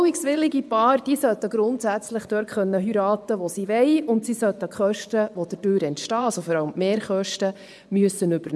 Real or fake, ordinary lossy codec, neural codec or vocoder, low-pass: fake; none; vocoder, 24 kHz, 100 mel bands, Vocos; none